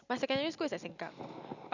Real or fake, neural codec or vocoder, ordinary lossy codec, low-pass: real; none; none; 7.2 kHz